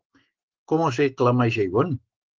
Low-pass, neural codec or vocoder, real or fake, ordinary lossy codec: 7.2 kHz; autoencoder, 48 kHz, 128 numbers a frame, DAC-VAE, trained on Japanese speech; fake; Opus, 16 kbps